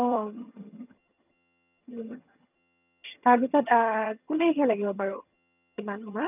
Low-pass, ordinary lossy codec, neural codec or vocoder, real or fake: 3.6 kHz; none; vocoder, 22.05 kHz, 80 mel bands, HiFi-GAN; fake